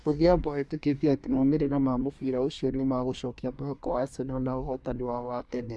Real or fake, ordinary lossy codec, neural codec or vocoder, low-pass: fake; none; codec, 24 kHz, 1 kbps, SNAC; none